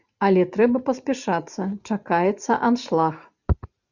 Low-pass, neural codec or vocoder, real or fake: 7.2 kHz; none; real